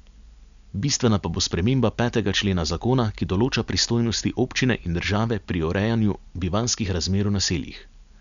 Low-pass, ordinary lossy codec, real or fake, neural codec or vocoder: 7.2 kHz; none; real; none